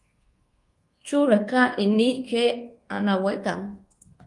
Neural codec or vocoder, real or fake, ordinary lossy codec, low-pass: codec, 24 kHz, 1.2 kbps, DualCodec; fake; Opus, 24 kbps; 10.8 kHz